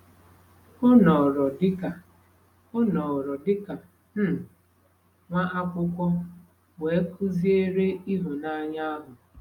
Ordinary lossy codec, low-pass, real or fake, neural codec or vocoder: none; 19.8 kHz; real; none